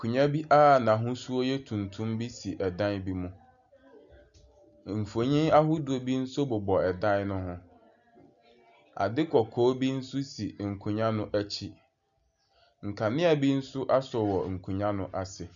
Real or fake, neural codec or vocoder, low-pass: real; none; 7.2 kHz